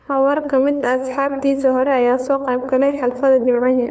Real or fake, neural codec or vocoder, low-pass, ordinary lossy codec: fake; codec, 16 kHz, 2 kbps, FunCodec, trained on LibriTTS, 25 frames a second; none; none